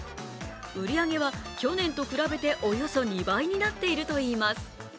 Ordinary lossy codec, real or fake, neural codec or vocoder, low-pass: none; real; none; none